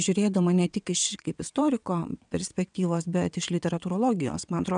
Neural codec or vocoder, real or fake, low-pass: vocoder, 22.05 kHz, 80 mel bands, WaveNeXt; fake; 9.9 kHz